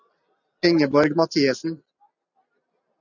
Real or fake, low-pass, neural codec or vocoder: real; 7.2 kHz; none